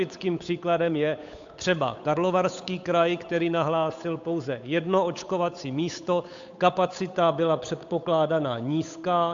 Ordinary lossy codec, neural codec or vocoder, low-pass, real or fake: AAC, 64 kbps; codec, 16 kHz, 8 kbps, FunCodec, trained on Chinese and English, 25 frames a second; 7.2 kHz; fake